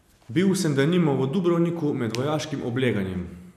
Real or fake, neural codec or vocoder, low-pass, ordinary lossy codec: real; none; 14.4 kHz; none